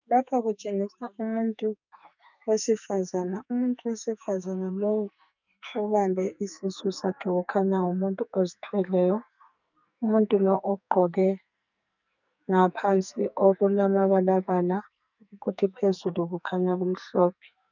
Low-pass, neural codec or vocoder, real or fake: 7.2 kHz; codec, 44.1 kHz, 2.6 kbps, SNAC; fake